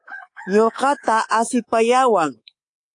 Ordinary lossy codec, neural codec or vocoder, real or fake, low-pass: AAC, 48 kbps; codec, 24 kHz, 3.1 kbps, DualCodec; fake; 10.8 kHz